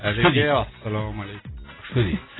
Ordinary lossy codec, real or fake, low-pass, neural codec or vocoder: AAC, 16 kbps; real; 7.2 kHz; none